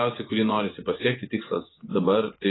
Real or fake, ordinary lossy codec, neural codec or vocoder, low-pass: fake; AAC, 16 kbps; vocoder, 24 kHz, 100 mel bands, Vocos; 7.2 kHz